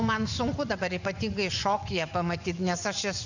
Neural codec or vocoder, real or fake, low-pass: vocoder, 24 kHz, 100 mel bands, Vocos; fake; 7.2 kHz